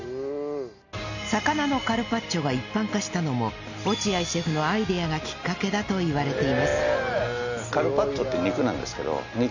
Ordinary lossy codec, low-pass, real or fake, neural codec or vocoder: none; 7.2 kHz; real; none